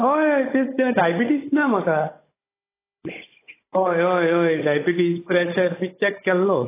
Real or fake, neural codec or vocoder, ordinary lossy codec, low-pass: fake; codec, 16 kHz, 16 kbps, FunCodec, trained on Chinese and English, 50 frames a second; AAC, 16 kbps; 3.6 kHz